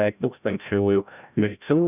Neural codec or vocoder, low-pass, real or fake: codec, 16 kHz, 0.5 kbps, FreqCodec, larger model; 3.6 kHz; fake